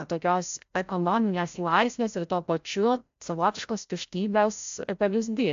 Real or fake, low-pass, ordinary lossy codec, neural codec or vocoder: fake; 7.2 kHz; AAC, 64 kbps; codec, 16 kHz, 0.5 kbps, FreqCodec, larger model